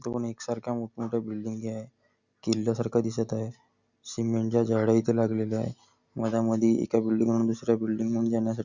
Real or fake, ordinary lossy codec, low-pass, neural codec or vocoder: real; none; 7.2 kHz; none